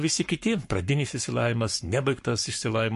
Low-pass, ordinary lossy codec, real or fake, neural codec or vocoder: 14.4 kHz; MP3, 48 kbps; fake; codec, 44.1 kHz, 7.8 kbps, Pupu-Codec